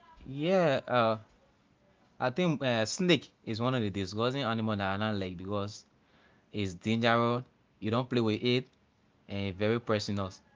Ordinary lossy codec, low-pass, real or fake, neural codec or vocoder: Opus, 24 kbps; 7.2 kHz; real; none